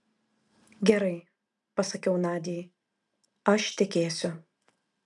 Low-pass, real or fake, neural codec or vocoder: 10.8 kHz; real; none